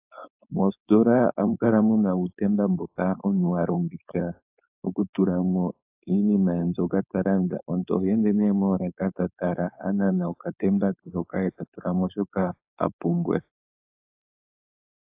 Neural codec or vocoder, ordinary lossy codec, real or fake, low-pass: codec, 16 kHz, 4.8 kbps, FACodec; AAC, 32 kbps; fake; 3.6 kHz